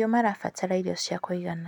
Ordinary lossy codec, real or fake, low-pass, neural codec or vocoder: none; real; 19.8 kHz; none